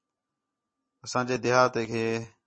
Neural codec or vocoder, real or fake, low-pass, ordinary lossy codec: none; real; 9.9 kHz; MP3, 32 kbps